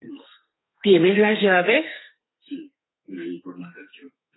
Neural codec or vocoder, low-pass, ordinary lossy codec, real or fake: codec, 32 kHz, 1.9 kbps, SNAC; 7.2 kHz; AAC, 16 kbps; fake